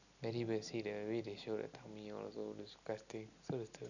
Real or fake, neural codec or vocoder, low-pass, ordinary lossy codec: real; none; 7.2 kHz; none